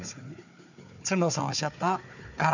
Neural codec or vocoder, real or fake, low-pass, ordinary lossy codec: codec, 16 kHz, 4 kbps, FunCodec, trained on Chinese and English, 50 frames a second; fake; 7.2 kHz; none